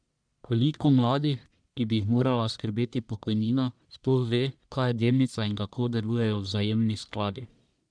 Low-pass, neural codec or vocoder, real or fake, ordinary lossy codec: 9.9 kHz; codec, 44.1 kHz, 1.7 kbps, Pupu-Codec; fake; none